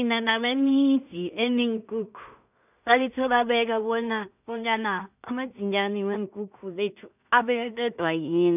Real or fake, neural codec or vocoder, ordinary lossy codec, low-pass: fake; codec, 16 kHz in and 24 kHz out, 0.4 kbps, LongCat-Audio-Codec, two codebook decoder; none; 3.6 kHz